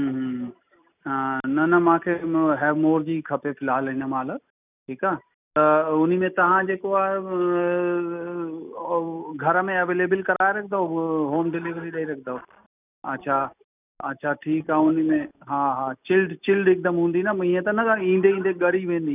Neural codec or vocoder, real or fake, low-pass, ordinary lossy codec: none; real; 3.6 kHz; none